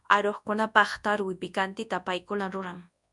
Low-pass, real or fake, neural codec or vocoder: 10.8 kHz; fake; codec, 24 kHz, 0.9 kbps, WavTokenizer, large speech release